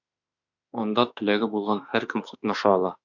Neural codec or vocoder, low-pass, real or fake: autoencoder, 48 kHz, 32 numbers a frame, DAC-VAE, trained on Japanese speech; 7.2 kHz; fake